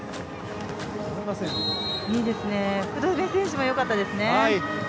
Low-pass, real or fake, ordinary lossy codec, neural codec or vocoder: none; real; none; none